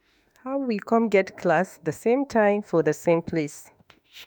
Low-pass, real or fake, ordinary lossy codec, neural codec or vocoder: none; fake; none; autoencoder, 48 kHz, 32 numbers a frame, DAC-VAE, trained on Japanese speech